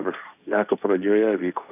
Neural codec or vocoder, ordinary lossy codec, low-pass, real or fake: codec, 16 kHz, 1.1 kbps, Voila-Tokenizer; AAC, 32 kbps; 3.6 kHz; fake